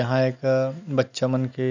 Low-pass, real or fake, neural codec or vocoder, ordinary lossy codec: 7.2 kHz; real; none; none